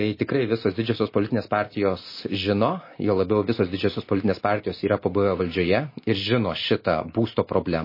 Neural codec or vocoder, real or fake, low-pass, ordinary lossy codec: none; real; 5.4 kHz; MP3, 24 kbps